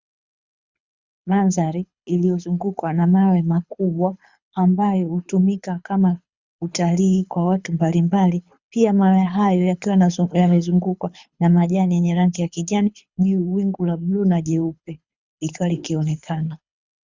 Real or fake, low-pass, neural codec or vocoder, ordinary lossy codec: fake; 7.2 kHz; codec, 24 kHz, 6 kbps, HILCodec; Opus, 64 kbps